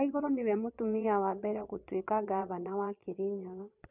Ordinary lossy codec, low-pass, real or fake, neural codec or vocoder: none; 3.6 kHz; fake; vocoder, 22.05 kHz, 80 mel bands, Vocos